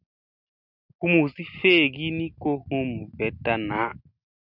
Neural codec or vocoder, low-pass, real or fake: none; 5.4 kHz; real